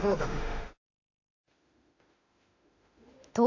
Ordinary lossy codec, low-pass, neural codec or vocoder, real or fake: AAC, 48 kbps; 7.2 kHz; autoencoder, 48 kHz, 32 numbers a frame, DAC-VAE, trained on Japanese speech; fake